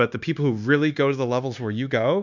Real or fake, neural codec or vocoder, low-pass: fake; codec, 16 kHz, 0.9 kbps, LongCat-Audio-Codec; 7.2 kHz